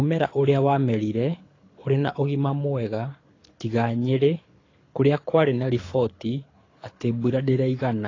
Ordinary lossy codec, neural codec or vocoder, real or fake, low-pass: AAC, 32 kbps; codec, 24 kHz, 6 kbps, HILCodec; fake; 7.2 kHz